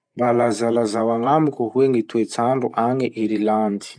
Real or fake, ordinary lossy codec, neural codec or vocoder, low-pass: fake; none; vocoder, 44.1 kHz, 128 mel bands every 512 samples, BigVGAN v2; 9.9 kHz